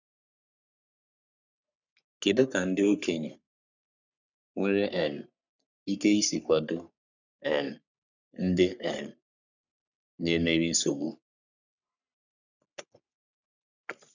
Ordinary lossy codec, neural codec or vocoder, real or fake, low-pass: none; codec, 44.1 kHz, 3.4 kbps, Pupu-Codec; fake; 7.2 kHz